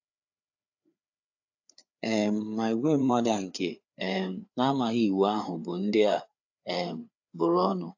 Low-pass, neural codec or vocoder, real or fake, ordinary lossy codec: 7.2 kHz; codec, 16 kHz, 4 kbps, FreqCodec, larger model; fake; AAC, 48 kbps